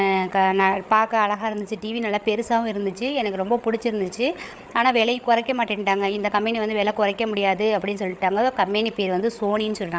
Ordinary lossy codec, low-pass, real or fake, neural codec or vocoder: none; none; fake; codec, 16 kHz, 8 kbps, FreqCodec, larger model